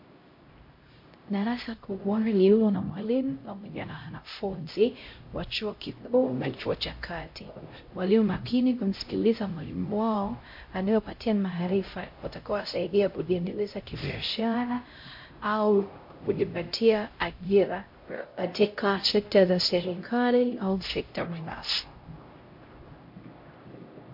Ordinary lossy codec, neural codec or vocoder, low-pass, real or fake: MP3, 32 kbps; codec, 16 kHz, 0.5 kbps, X-Codec, HuBERT features, trained on LibriSpeech; 5.4 kHz; fake